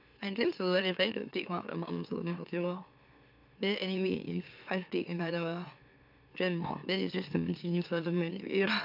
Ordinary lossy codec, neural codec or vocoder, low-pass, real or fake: AAC, 48 kbps; autoencoder, 44.1 kHz, a latent of 192 numbers a frame, MeloTTS; 5.4 kHz; fake